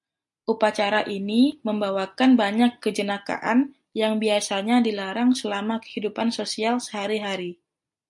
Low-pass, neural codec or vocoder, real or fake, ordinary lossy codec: 10.8 kHz; none; real; MP3, 64 kbps